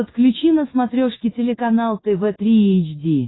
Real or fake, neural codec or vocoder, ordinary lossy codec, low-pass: fake; autoencoder, 48 kHz, 128 numbers a frame, DAC-VAE, trained on Japanese speech; AAC, 16 kbps; 7.2 kHz